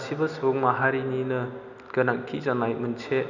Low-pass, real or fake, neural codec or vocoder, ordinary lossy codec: 7.2 kHz; real; none; none